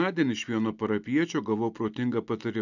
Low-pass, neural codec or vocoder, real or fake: 7.2 kHz; none; real